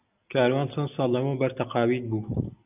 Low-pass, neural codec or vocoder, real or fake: 3.6 kHz; none; real